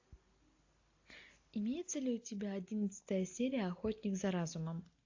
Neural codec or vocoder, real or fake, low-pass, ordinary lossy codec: none; real; 7.2 kHz; AAC, 48 kbps